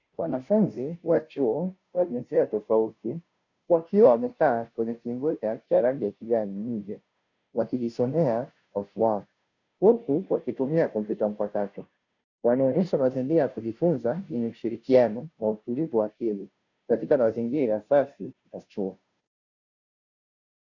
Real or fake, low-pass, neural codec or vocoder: fake; 7.2 kHz; codec, 16 kHz, 0.5 kbps, FunCodec, trained on Chinese and English, 25 frames a second